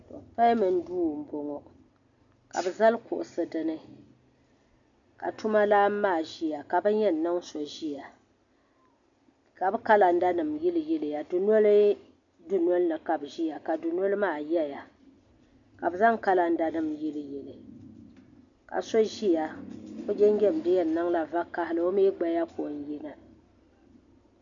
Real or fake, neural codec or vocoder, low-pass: real; none; 7.2 kHz